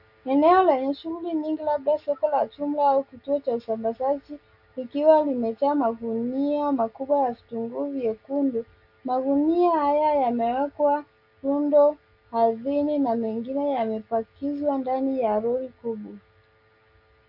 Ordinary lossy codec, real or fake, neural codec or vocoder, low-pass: AAC, 48 kbps; real; none; 5.4 kHz